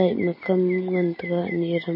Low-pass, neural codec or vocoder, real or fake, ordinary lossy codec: 5.4 kHz; none; real; MP3, 24 kbps